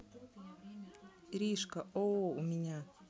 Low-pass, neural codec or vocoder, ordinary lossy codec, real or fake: none; none; none; real